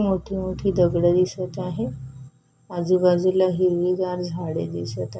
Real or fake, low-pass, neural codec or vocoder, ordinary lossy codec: real; none; none; none